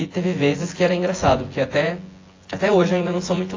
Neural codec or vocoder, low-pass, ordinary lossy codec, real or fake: vocoder, 24 kHz, 100 mel bands, Vocos; 7.2 kHz; AAC, 32 kbps; fake